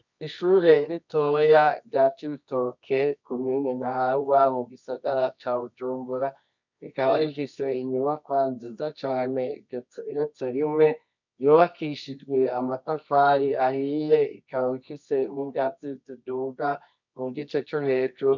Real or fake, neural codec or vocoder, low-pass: fake; codec, 24 kHz, 0.9 kbps, WavTokenizer, medium music audio release; 7.2 kHz